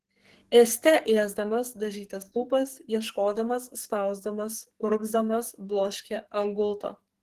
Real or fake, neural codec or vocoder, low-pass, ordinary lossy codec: fake; codec, 44.1 kHz, 2.6 kbps, SNAC; 14.4 kHz; Opus, 16 kbps